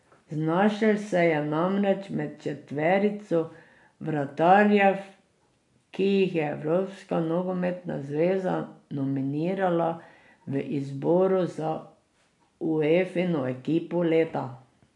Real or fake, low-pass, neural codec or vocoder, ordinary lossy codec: real; 10.8 kHz; none; none